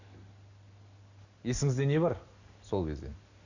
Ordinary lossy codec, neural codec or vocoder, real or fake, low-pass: none; none; real; 7.2 kHz